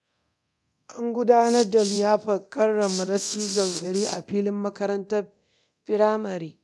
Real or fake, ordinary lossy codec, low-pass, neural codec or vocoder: fake; none; none; codec, 24 kHz, 0.9 kbps, DualCodec